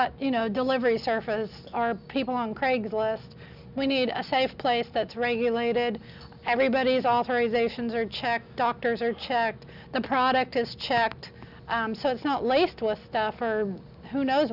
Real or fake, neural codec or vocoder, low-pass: real; none; 5.4 kHz